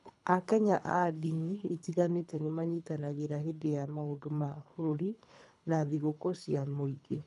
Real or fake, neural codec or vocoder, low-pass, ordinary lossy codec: fake; codec, 24 kHz, 3 kbps, HILCodec; 10.8 kHz; none